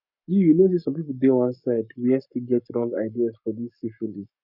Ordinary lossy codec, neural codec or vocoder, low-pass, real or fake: none; codec, 44.1 kHz, 7.8 kbps, Pupu-Codec; 5.4 kHz; fake